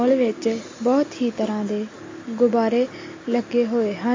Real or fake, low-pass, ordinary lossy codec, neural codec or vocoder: real; 7.2 kHz; MP3, 32 kbps; none